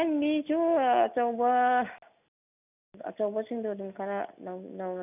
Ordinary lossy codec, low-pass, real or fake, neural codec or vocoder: none; 3.6 kHz; real; none